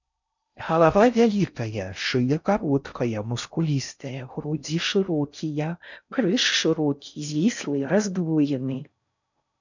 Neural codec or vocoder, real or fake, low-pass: codec, 16 kHz in and 24 kHz out, 0.6 kbps, FocalCodec, streaming, 4096 codes; fake; 7.2 kHz